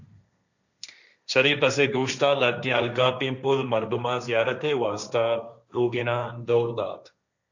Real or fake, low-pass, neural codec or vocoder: fake; 7.2 kHz; codec, 16 kHz, 1.1 kbps, Voila-Tokenizer